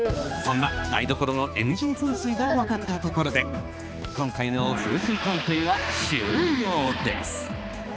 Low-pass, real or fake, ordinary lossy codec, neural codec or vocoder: none; fake; none; codec, 16 kHz, 2 kbps, X-Codec, HuBERT features, trained on balanced general audio